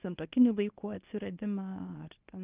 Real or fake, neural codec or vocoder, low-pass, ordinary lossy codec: fake; codec, 24 kHz, 0.9 kbps, WavTokenizer, medium speech release version 1; 3.6 kHz; Opus, 24 kbps